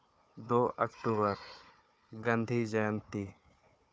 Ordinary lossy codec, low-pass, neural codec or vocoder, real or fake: none; none; codec, 16 kHz, 4 kbps, FunCodec, trained on Chinese and English, 50 frames a second; fake